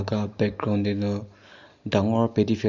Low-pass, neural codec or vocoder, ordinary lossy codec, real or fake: 7.2 kHz; none; Opus, 64 kbps; real